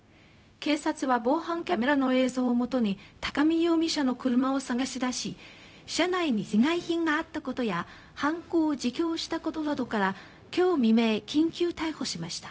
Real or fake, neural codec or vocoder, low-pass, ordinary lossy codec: fake; codec, 16 kHz, 0.4 kbps, LongCat-Audio-Codec; none; none